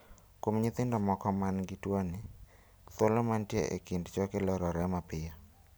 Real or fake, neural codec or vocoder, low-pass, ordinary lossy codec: real; none; none; none